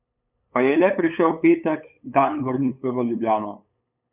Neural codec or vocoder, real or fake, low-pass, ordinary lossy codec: codec, 16 kHz, 8 kbps, FunCodec, trained on LibriTTS, 25 frames a second; fake; 3.6 kHz; none